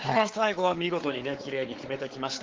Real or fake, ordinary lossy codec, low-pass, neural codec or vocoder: fake; Opus, 16 kbps; 7.2 kHz; codec, 16 kHz, 4 kbps, X-Codec, WavLM features, trained on Multilingual LibriSpeech